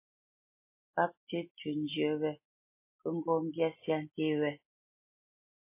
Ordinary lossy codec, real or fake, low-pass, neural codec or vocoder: MP3, 24 kbps; real; 3.6 kHz; none